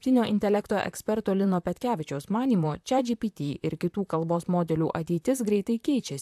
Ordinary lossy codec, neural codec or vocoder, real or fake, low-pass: AAC, 96 kbps; vocoder, 44.1 kHz, 128 mel bands, Pupu-Vocoder; fake; 14.4 kHz